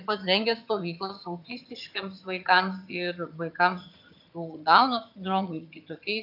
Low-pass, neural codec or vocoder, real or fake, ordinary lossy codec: 5.4 kHz; vocoder, 22.05 kHz, 80 mel bands, HiFi-GAN; fake; AAC, 48 kbps